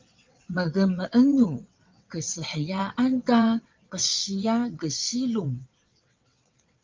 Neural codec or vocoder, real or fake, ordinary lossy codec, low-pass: vocoder, 22.05 kHz, 80 mel bands, WaveNeXt; fake; Opus, 24 kbps; 7.2 kHz